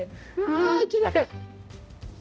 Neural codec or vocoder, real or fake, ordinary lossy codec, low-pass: codec, 16 kHz, 0.5 kbps, X-Codec, HuBERT features, trained on balanced general audio; fake; none; none